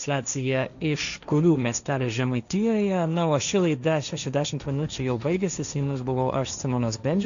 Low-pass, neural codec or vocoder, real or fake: 7.2 kHz; codec, 16 kHz, 1.1 kbps, Voila-Tokenizer; fake